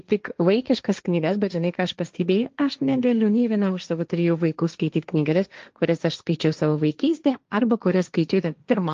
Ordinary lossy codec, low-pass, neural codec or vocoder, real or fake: Opus, 24 kbps; 7.2 kHz; codec, 16 kHz, 1.1 kbps, Voila-Tokenizer; fake